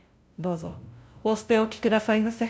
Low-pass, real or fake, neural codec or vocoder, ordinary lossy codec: none; fake; codec, 16 kHz, 0.5 kbps, FunCodec, trained on LibriTTS, 25 frames a second; none